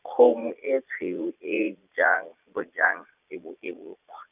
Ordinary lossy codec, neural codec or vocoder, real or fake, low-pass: none; vocoder, 22.05 kHz, 80 mel bands, Vocos; fake; 3.6 kHz